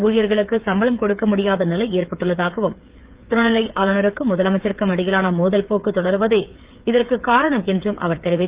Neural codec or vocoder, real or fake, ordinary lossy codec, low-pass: codec, 16 kHz, 8 kbps, FreqCodec, smaller model; fake; Opus, 32 kbps; 3.6 kHz